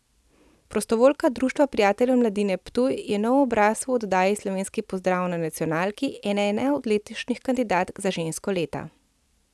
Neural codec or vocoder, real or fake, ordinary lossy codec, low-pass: none; real; none; none